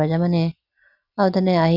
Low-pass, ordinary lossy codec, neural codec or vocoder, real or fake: 5.4 kHz; none; none; real